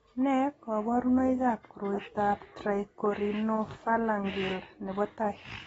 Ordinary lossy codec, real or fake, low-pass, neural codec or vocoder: AAC, 24 kbps; real; 19.8 kHz; none